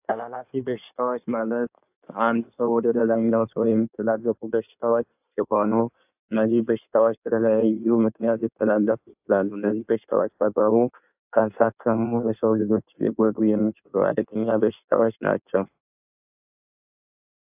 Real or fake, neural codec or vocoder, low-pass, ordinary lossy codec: fake; codec, 16 kHz in and 24 kHz out, 1.1 kbps, FireRedTTS-2 codec; 3.6 kHz; AAC, 32 kbps